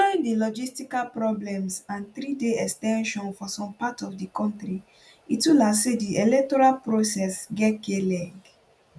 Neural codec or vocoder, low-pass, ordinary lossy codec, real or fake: none; none; none; real